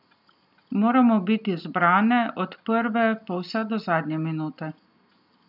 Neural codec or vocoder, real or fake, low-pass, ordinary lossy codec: none; real; 5.4 kHz; none